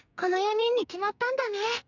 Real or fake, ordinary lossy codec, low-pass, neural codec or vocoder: fake; none; 7.2 kHz; codec, 44.1 kHz, 2.6 kbps, SNAC